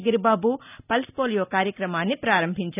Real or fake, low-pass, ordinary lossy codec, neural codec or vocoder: real; 3.6 kHz; none; none